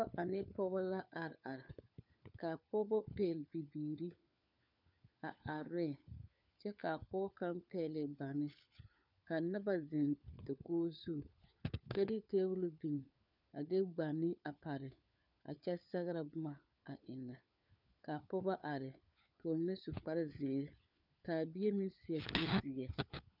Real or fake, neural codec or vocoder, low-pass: fake; codec, 16 kHz, 4 kbps, FunCodec, trained on LibriTTS, 50 frames a second; 5.4 kHz